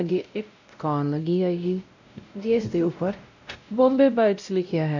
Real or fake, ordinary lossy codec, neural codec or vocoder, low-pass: fake; none; codec, 16 kHz, 0.5 kbps, X-Codec, WavLM features, trained on Multilingual LibriSpeech; 7.2 kHz